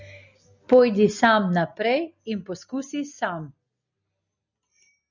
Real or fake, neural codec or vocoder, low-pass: real; none; 7.2 kHz